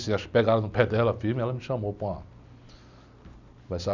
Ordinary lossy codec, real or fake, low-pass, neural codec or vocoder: none; real; 7.2 kHz; none